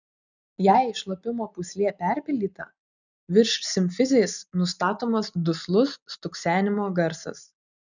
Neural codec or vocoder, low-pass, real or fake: none; 7.2 kHz; real